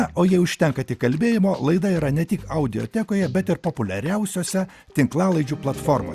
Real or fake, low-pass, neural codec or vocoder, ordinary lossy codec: real; 14.4 kHz; none; Opus, 64 kbps